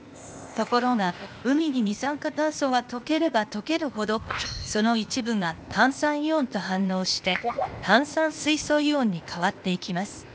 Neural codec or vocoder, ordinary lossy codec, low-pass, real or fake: codec, 16 kHz, 0.8 kbps, ZipCodec; none; none; fake